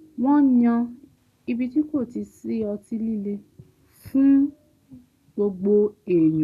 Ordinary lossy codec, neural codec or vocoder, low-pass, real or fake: none; none; 14.4 kHz; real